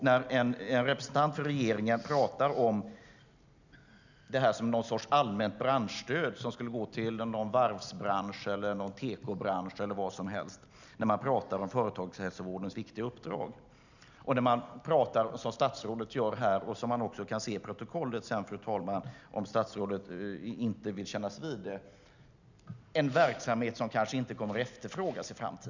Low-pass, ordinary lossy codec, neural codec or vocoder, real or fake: 7.2 kHz; none; none; real